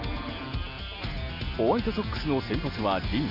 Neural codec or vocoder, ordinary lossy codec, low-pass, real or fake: none; none; 5.4 kHz; real